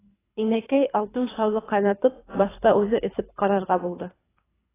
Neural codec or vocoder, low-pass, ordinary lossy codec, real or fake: codec, 24 kHz, 3 kbps, HILCodec; 3.6 kHz; AAC, 16 kbps; fake